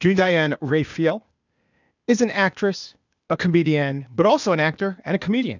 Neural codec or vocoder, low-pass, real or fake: codec, 16 kHz, 0.8 kbps, ZipCodec; 7.2 kHz; fake